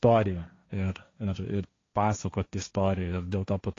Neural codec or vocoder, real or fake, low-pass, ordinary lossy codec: codec, 16 kHz, 1.1 kbps, Voila-Tokenizer; fake; 7.2 kHz; AAC, 32 kbps